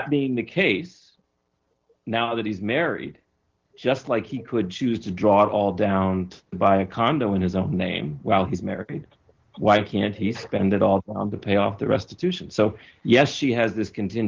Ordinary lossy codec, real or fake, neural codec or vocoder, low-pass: Opus, 16 kbps; real; none; 7.2 kHz